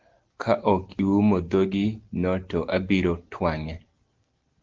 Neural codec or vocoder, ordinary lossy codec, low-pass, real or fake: none; Opus, 16 kbps; 7.2 kHz; real